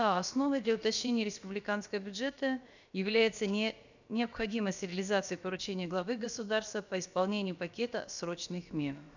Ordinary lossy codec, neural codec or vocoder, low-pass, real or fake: none; codec, 16 kHz, about 1 kbps, DyCAST, with the encoder's durations; 7.2 kHz; fake